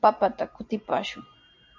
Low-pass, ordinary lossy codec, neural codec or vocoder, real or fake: 7.2 kHz; MP3, 64 kbps; none; real